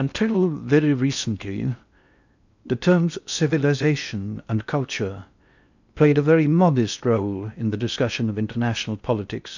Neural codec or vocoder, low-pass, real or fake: codec, 16 kHz in and 24 kHz out, 0.6 kbps, FocalCodec, streaming, 2048 codes; 7.2 kHz; fake